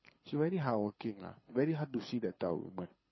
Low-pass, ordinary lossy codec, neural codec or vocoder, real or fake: 7.2 kHz; MP3, 24 kbps; codec, 24 kHz, 6 kbps, HILCodec; fake